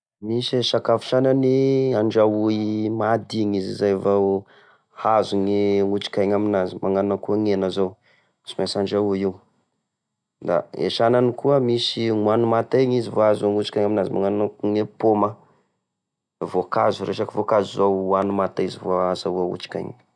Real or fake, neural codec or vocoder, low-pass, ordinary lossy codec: real; none; 9.9 kHz; none